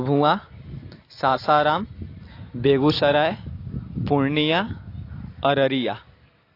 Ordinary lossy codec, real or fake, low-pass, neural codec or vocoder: AAC, 32 kbps; real; 5.4 kHz; none